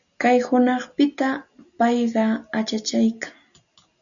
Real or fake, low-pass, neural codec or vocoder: real; 7.2 kHz; none